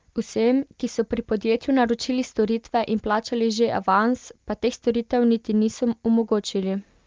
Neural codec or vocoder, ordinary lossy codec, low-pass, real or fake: none; Opus, 24 kbps; 7.2 kHz; real